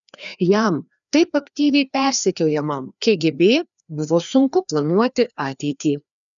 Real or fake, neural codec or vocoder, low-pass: fake; codec, 16 kHz, 2 kbps, FreqCodec, larger model; 7.2 kHz